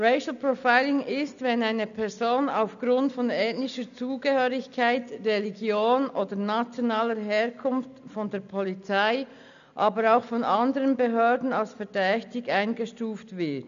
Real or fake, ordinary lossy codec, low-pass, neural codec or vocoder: real; MP3, 96 kbps; 7.2 kHz; none